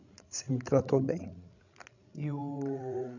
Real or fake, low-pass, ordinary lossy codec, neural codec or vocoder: fake; 7.2 kHz; none; codec, 16 kHz, 16 kbps, FreqCodec, larger model